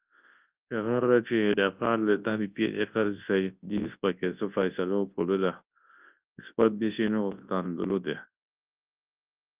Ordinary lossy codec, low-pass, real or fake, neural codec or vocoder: Opus, 24 kbps; 3.6 kHz; fake; codec, 24 kHz, 0.9 kbps, WavTokenizer, large speech release